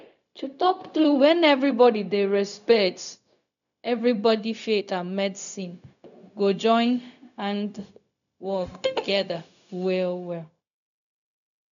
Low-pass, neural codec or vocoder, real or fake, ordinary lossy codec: 7.2 kHz; codec, 16 kHz, 0.4 kbps, LongCat-Audio-Codec; fake; none